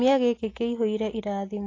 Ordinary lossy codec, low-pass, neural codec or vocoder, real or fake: AAC, 32 kbps; 7.2 kHz; none; real